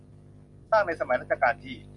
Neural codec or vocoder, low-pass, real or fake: none; 10.8 kHz; real